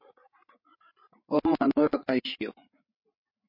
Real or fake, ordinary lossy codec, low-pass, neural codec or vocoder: fake; MP3, 32 kbps; 5.4 kHz; codec, 16 kHz, 8 kbps, FreqCodec, larger model